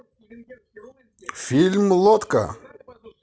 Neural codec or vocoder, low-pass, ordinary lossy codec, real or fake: none; none; none; real